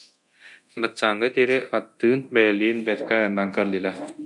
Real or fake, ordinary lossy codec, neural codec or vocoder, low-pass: fake; MP3, 96 kbps; codec, 24 kHz, 0.9 kbps, DualCodec; 10.8 kHz